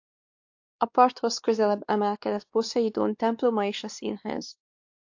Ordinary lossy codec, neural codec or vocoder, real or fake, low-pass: AAC, 48 kbps; codec, 16 kHz, 2 kbps, X-Codec, WavLM features, trained on Multilingual LibriSpeech; fake; 7.2 kHz